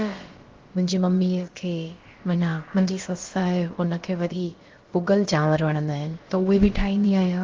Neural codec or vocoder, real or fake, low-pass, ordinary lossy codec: codec, 16 kHz, about 1 kbps, DyCAST, with the encoder's durations; fake; 7.2 kHz; Opus, 16 kbps